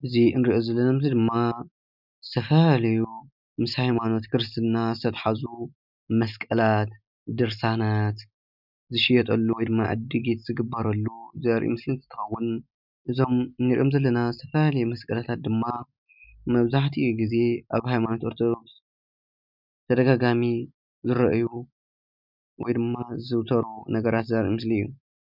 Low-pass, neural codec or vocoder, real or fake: 5.4 kHz; none; real